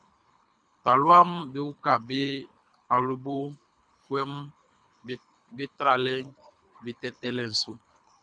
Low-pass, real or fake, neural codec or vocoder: 9.9 kHz; fake; codec, 24 kHz, 3 kbps, HILCodec